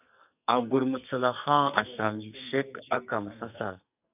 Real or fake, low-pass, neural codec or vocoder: fake; 3.6 kHz; codec, 44.1 kHz, 2.6 kbps, SNAC